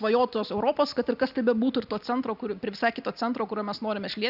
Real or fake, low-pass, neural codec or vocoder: real; 5.4 kHz; none